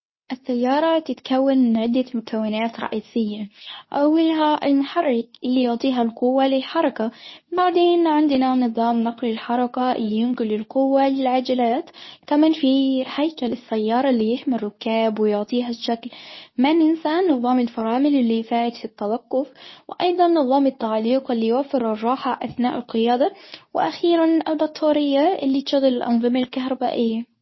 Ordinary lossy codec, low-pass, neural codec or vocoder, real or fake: MP3, 24 kbps; 7.2 kHz; codec, 24 kHz, 0.9 kbps, WavTokenizer, medium speech release version 2; fake